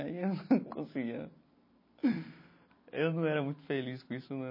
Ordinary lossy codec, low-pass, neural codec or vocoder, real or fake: MP3, 24 kbps; 5.4 kHz; none; real